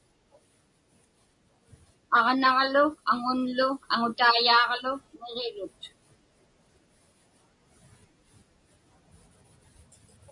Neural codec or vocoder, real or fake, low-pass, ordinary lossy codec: none; real; 10.8 kHz; MP3, 48 kbps